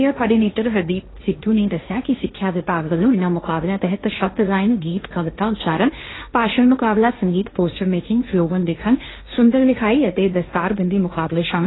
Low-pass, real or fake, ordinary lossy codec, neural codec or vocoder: 7.2 kHz; fake; AAC, 16 kbps; codec, 16 kHz, 1.1 kbps, Voila-Tokenizer